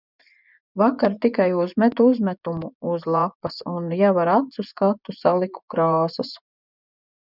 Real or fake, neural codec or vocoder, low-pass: fake; vocoder, 24 kHz, 100 mel bands, Vocos; 5.4 kHz